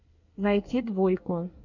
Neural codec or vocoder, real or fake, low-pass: codec, 24 kHz, 1 kbps, SNAC; fake; 7.2 kHz